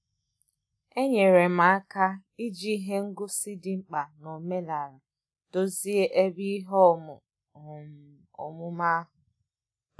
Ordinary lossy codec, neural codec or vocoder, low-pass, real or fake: none; none; none; real